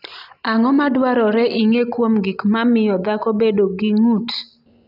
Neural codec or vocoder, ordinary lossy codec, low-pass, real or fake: none; none; 5.4 kHz; real